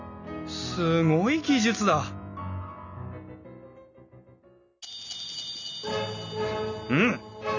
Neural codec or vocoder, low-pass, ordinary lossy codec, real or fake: none; 7.2 kHz; none; real